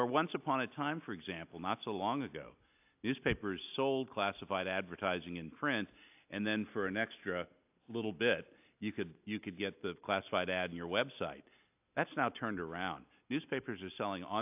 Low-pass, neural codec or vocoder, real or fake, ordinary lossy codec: 3.6 kHz; none; real; AAC, 32 kbps